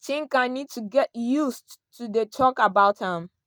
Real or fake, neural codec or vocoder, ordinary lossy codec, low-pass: real; none; none; none